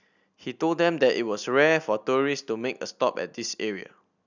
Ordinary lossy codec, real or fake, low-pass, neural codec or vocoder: none; real; 7.2 kHz; none